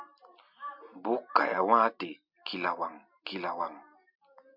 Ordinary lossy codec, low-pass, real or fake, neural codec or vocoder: MP3, 48 kbps; 5.4 kHz; real; none